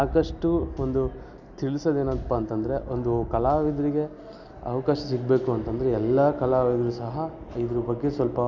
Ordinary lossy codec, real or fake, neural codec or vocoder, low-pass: none; real; none; 7.2 kHz